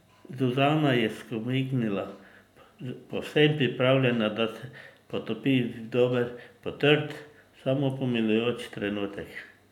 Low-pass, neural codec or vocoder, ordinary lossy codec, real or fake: 19.8 kHz; none; none; real